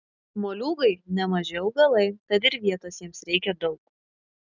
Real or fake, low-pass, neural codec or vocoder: real; 7.2 kHz; none